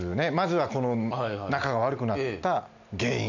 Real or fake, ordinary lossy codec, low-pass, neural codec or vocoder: real; none; 7.2 kHz; none